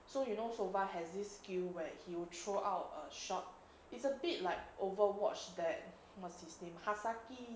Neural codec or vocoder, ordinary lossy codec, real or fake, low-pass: none; none; real; none